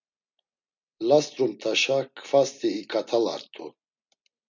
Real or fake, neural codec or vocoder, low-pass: real; none; 7.2 kHz